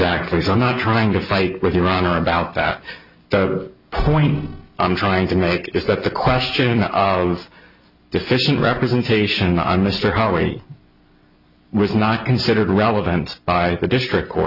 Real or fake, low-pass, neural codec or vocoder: real; 5.4 kHz; none